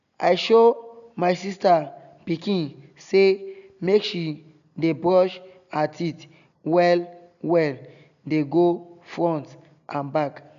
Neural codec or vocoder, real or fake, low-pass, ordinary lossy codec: none; real; 7.2 kHz; none